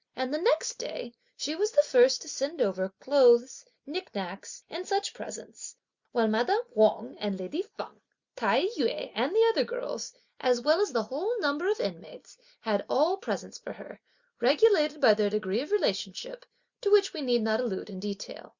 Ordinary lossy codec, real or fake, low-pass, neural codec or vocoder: Opus, 64 kbps; real; 7.2 kHz; none